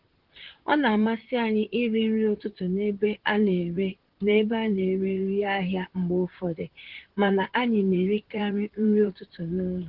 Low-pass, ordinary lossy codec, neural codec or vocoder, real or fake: 5.4 kHz; Opus, 16 kbps; vocoder, 44.1 kHz, 128 mel bands, Pupu-Vocoder; fake